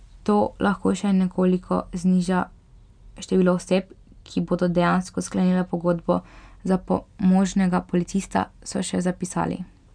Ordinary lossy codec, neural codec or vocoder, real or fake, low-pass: none; none; real; 9.9 kHz